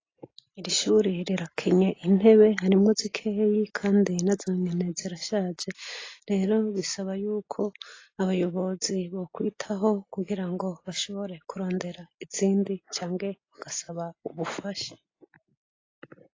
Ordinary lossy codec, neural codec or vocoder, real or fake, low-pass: AAC, 32 kbps; none; real; 7.2 kHz